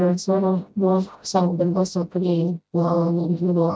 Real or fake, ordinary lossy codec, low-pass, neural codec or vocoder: fake; none; none; codec, 16 kHz, 0.5 kbps, FreqCodec, smaller model